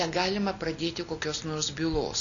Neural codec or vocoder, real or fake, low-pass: none; real; 7.2 kHz